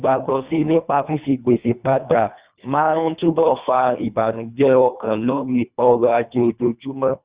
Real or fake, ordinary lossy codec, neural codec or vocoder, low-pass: fake; Opus, 64 kbps; codec, 24 kHz, 1.5 kbps, HILCodec; 3.6 kHz